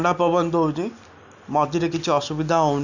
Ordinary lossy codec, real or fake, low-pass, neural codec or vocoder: none; real; 7.2 kHz; none